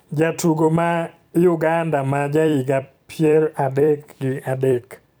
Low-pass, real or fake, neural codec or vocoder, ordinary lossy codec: none; fake; vocoder, 44.1 kHz, 128 mel bands every 256 samples, BigVGAN v2; none